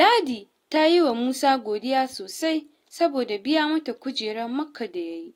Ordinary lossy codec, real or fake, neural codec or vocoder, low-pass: AAC, 48 kbps; real; none; 19.8 kHz